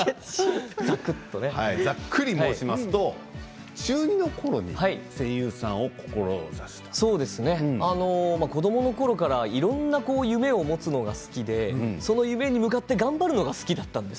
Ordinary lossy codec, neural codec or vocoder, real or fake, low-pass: none; none; real; none